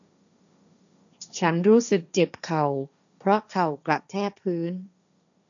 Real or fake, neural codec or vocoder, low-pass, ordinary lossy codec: fake; codec, 16 kHz, 1.1 kbps, Voila-Tokenizer; 7.2 kHz; none